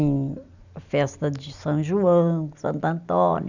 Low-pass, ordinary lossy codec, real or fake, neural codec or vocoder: 7.2 kHz; none; real; none